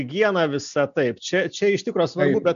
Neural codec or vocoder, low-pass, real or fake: none; 7.2 kHz; real